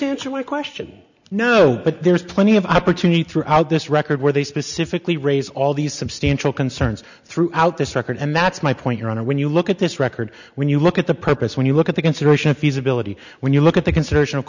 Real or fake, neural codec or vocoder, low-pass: real; none; 7.2 kHz